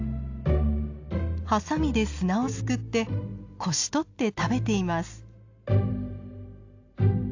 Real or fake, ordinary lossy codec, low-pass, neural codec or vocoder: real; AAC, 48 kbps; 7.2 kHz; none